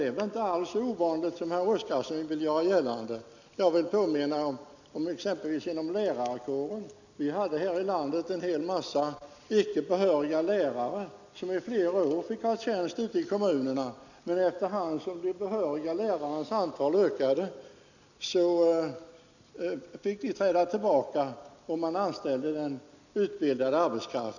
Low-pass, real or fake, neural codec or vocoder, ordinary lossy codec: 7.2 kHz; real; none; none